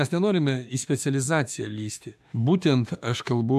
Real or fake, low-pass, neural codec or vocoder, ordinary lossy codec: fake; 14.4 kHz; autoencoder, 48 kHz, 32 numbers a frame, DAC-VAE, trained on Japanese speech; AAC, 96 kbps